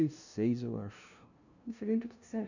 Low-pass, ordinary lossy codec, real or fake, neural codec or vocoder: 7.2 kHz; none; fake; codec, 16 kHz, 0.5 kbps, FunCodec, trained on LibriTTS, 25 frames a second